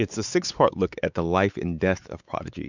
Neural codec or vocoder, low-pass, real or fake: codec, 16 kHz, 4 kbps, X-Codec, WavLM features, trained on Multilingual LibriSpeech; 7.2 kHz; fake